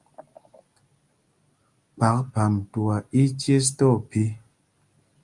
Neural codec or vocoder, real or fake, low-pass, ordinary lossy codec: none; real; 10.8 kHz; Opus, 32 kbps